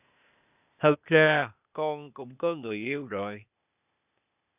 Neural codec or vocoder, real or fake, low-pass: codec, 16 kHz, 0.8 kbps, ZipCodec; fake; 3.6 kHz